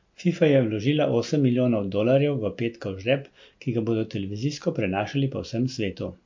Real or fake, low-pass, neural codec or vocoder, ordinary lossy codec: real; 7.2 kHz; none; MP3, 48 kbps